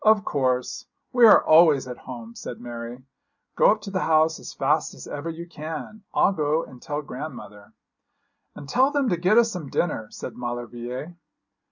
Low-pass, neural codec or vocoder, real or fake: 7.2 kHz; none; real